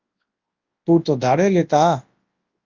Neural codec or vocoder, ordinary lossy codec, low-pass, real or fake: codec, 24 kHz, 0.9 kbps, WavTokenizer, large speech release; Opus, 32 kbps; 7.2 kHz; fake